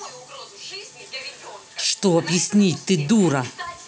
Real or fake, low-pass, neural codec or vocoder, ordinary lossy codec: real; none; none; none